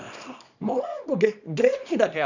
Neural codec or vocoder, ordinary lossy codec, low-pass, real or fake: codec, 24 kHz, 0.9 kbps, WavTokenizer, small release; none; 7.2 kHz; fake